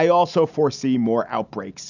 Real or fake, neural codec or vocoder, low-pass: real; none; 7.2 kHz